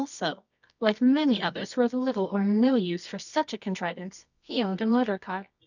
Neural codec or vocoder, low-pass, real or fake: codec, 24 kHz, 0.9 kbps, WavTokenizer, medium music audio release; 7.2 kHz; fake